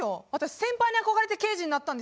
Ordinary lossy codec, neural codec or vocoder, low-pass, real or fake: none; none; none; real